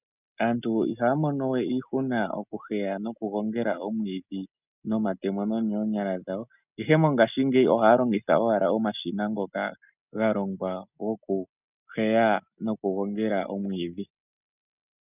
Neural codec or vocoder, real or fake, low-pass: none; real; 3.6 kHz